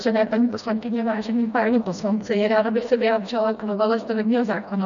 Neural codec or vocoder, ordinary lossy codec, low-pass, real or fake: codec, 16 kHz, 1 kbps, FreqCodec, smaller model; MP3, 96 kbps; 7.2 kHz; fake